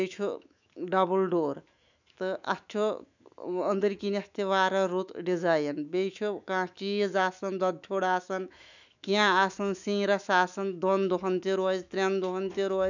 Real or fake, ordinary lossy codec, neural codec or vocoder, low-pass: fake; none; autoencoder, 48 kHz, 128 numbers a frame, DAC-VAE, trained on Japanese speech; 7.2 kHz